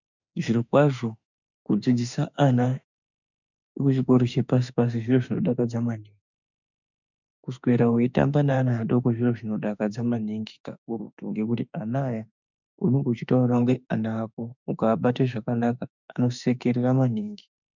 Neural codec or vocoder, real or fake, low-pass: autoencoder, 48 kHz, 32 numbers a frame, DAC-VAE, trained on Japanese speech; fake; 7.2 kHz